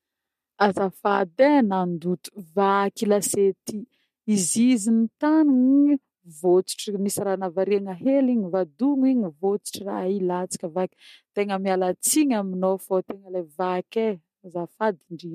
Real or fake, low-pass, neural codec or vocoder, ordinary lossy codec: real; 14.4 kHz; none; MP3, 64 kbps